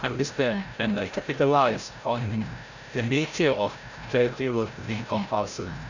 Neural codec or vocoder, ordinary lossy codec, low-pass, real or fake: codec, 16 kHz, 0.5 kbps, FreqCodec, larger model; none; 7.2 kHz; fake